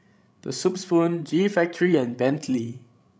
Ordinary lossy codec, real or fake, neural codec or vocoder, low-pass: none; fake; codec, 16 kHz, 16 kbps, FreqCodec, larger model; none